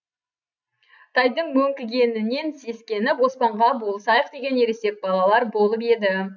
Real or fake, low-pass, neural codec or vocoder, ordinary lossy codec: real; 7.2 kHz; none; none